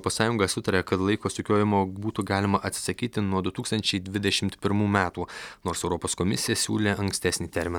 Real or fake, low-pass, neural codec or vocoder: real; 19.8 kHz; none